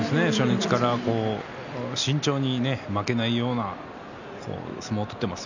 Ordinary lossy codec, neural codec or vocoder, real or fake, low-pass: none; none; real; 7.2 kHz